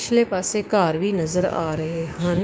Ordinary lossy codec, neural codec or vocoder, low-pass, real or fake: none; codec, 16 kHz, 6 kbps, DAC; none; fake